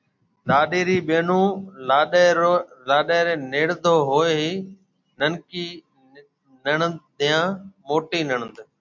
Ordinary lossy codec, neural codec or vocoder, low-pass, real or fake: MP3, 64 kbps; none; 7.2 kHz; real